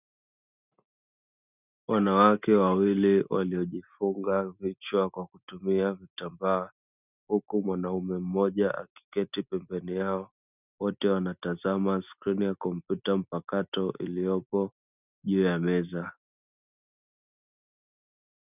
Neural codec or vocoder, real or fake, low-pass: none; real; 3.6 kHz